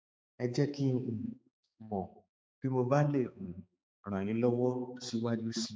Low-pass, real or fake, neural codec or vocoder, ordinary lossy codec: none; fake; codec, 16 kHz, 4 kbps, X-Codec, HuBERT features, trained on balanced general audio; none